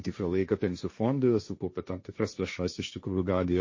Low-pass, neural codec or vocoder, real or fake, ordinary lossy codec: 7.2 kHz; codec, 16 kHz, 1.1 kbps, Voila-Tokenizer; fake; MP3, 32 kbps